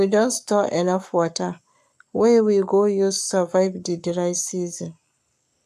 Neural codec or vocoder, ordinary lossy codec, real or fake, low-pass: codec, 44.1 kHz, 7.8 kbps, Pupu-Codec; AAC, 96 kbps; fake; 14.4 kHz